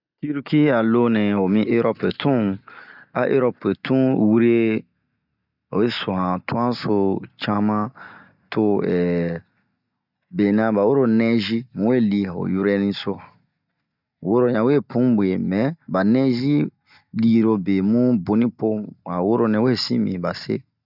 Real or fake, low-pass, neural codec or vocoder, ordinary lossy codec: real; 5.4 kHz; none; none